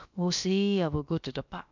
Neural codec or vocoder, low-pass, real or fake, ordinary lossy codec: codec, 16 kHz, about 1 kbps, DyCAST, with the encoder's durations; 7.2 kHz; fake; none